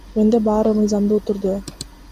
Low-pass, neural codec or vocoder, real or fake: 14.4 kHz; none; real